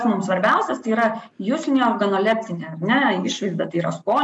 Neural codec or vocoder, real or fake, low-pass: none; real; 10.8 kHz